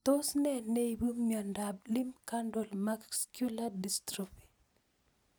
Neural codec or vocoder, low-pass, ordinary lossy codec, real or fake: none; none; none; real